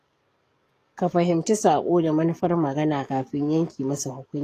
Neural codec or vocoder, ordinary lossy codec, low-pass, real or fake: codec, 44.1 kHz, 7.8 kbps, DAC; AAC, 48 kbps; 14.4 kHz; fake